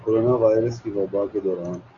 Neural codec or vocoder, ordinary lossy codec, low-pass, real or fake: none; Opus, 64 kbps; 7.2 kHz; real